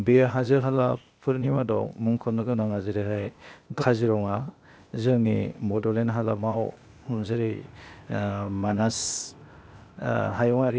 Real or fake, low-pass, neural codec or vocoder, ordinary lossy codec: fake; none; codec, 16 kHz, 0.8 kbps, ZipCodec; none